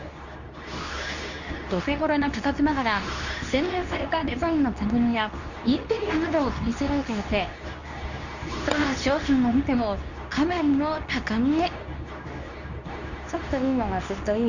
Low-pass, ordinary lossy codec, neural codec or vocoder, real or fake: 7.2 kHz; none; codec, 24 kHz, 0.9 kbps, WavTokenizer, medium speech release version 2; fake